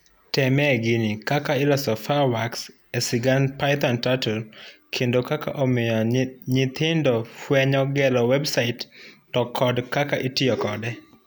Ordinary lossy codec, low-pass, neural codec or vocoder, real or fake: none; none; none; real